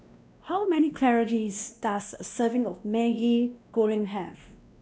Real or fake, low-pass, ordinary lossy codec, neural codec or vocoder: fake; none; none; codec, 16 kHz, 1 kbps, X-Codec, WavLM features, trained on Multilingual LibriSpeech